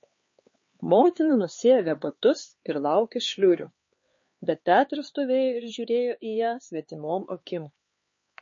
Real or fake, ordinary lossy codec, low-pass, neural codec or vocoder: fake; MP3, 32 kbps; 7.2 kHz; codec, 16 kHz, 4 kbps, X-Codec, HuBERT features, trained on LibriSpeech